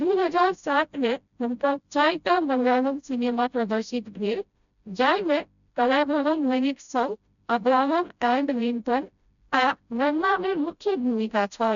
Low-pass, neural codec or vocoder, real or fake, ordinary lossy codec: 7.2 kHz; codec, 16 kHz, 0.5 kbps, FreqCodec, smaller model; fake; none